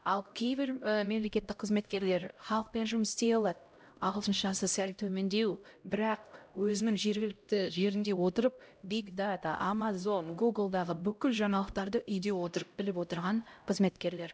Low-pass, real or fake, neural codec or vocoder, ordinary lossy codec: none; fake; codec, 16 kHz, 0.5 kbps, X-Codec, HuBERT features, trained on LibriSpeech; none